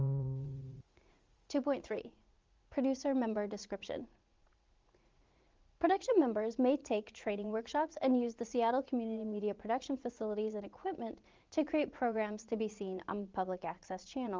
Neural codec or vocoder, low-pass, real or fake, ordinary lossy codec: vocoder, 44.1 kHz, 80 mel bands, Vocos; 7.2 kHz; fake; Opus, 32 kbps